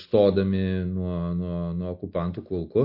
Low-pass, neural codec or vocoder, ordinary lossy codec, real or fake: 5.4 kHz; none; MP3, 32 kbps; real